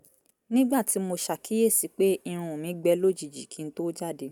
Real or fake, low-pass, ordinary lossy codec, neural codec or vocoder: real; none; none; none